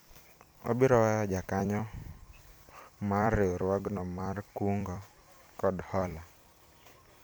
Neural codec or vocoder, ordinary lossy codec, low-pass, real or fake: vocoder, 44.1 kHz, 128 mel bands every 256 samples, BigVGAN v2; none; none; fake